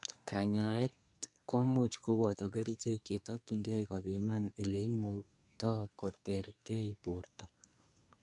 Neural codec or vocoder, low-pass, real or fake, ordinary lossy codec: codec, 24 kHz, 1 kbps, SNAC; 10.8 kHz; fake; none